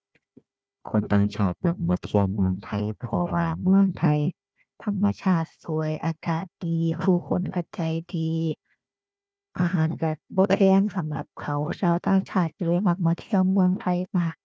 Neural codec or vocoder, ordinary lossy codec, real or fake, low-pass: codec, 16 kHz, 1 kbps, FunCodec, trained on Chinese and English, 50 frames a second; none; fake; none